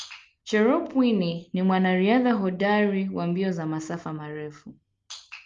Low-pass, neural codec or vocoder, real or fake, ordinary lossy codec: 7.2 kHz; none; real; Opus, 32 kbps